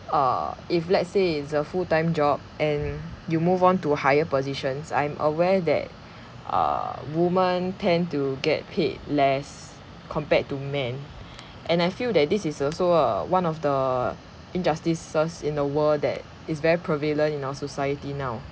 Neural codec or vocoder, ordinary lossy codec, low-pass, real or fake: none; none; none; real